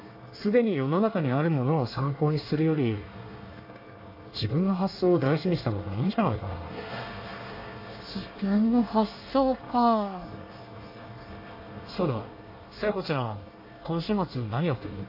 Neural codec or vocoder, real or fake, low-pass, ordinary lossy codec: codec, 24 kHz, 1 kbps, SNAC; fake; 5.4 kHz; AAC, 32 kbps